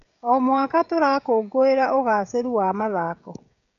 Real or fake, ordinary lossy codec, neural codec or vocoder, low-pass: fake; none; codec, 16 kHz, 16 kbps, FreqCodec, smaller model; 7.2 kHz